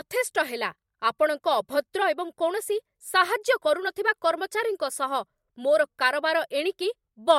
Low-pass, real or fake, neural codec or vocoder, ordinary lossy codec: 19.8 kHz; fake; vocoder, 44.1 kHz, 128 mel bands every 256 samples, BigVGAN v2; MP3, 64 kbps